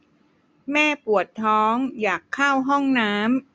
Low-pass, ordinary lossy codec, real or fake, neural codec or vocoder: none; none; real; none